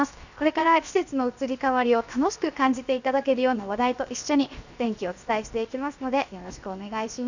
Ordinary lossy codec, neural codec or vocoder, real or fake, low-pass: none; codec, 16 kHz, 0.7 kbps, FocalCodec; fake; 7.2 kHz